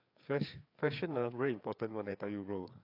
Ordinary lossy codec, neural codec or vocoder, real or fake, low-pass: AAC, 32 kbps; codec, 16 kHz, 4 kbps, FreqCodec, larger model; fake; 5.4 kHz